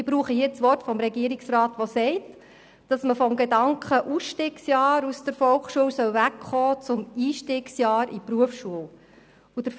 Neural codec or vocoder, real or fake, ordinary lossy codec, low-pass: none; real; none; none